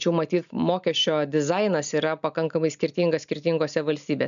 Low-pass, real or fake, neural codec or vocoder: 7.2 kHz; real; none